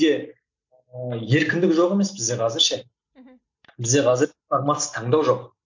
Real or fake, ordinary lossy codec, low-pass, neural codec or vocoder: real; MP3, 64 kbps; 7.2 kHz; none